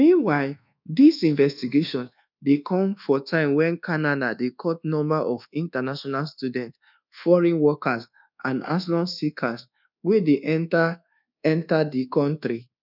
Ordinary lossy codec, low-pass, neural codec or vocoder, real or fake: none; 5.4 kHz; codec, 24 kHz, 1.2 kbps, DualCodec; fake